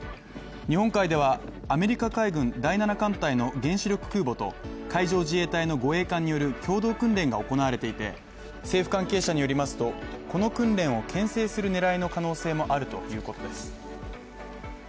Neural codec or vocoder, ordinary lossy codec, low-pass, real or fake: none; none; none; real